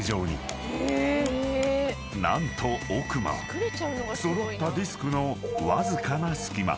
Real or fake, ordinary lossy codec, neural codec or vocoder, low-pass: real; none; none; none